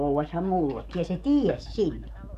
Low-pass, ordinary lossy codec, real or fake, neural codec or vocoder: 14.4 kHz; none; fake; codec, 44.1 kHz, 7.8 kbps, DAC